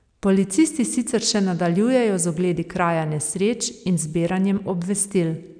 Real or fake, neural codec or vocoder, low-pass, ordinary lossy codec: real; none; 9.9 kHz; none